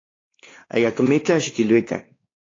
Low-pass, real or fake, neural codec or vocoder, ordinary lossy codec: 7.2 kHz; fake; codec, 16 kHz, 2 kbps, X-Codec, WavLM features, trained on Multilingual LibriSpeech; AAC, 32 kbps